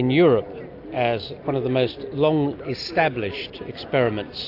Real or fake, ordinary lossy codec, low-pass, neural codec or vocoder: real; AAC, 32 kbps; 5.4 kHz; none